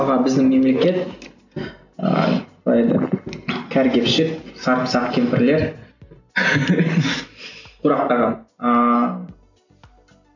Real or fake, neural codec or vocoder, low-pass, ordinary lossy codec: real; none; 7.2 kHz; none